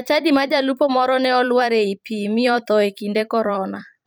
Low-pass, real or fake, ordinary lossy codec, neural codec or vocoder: none; fake; none; vocoder, 44.1 kHz, 128 mel bands every 512 samples, BigVGAN v2